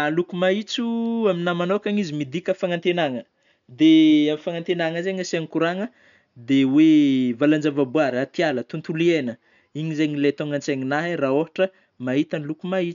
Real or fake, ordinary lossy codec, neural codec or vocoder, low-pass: real; none; none; 7.2 kHz